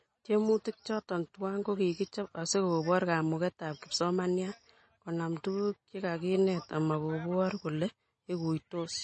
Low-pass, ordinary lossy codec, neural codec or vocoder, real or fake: 10.8 kHz; MP3, 32 kbps; none; real